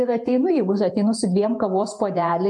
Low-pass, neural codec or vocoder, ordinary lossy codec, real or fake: 10.8 kHz; vocoder, 48 kHz, 128 mel bands, Vocos; MP3, 64 kbps; fake